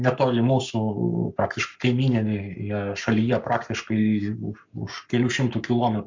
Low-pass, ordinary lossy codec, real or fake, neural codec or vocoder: 7.2 kHz; MP3, 64 kbps; fake; codec, 44.1 kHz, 7.8 kbps, Pupu-Codec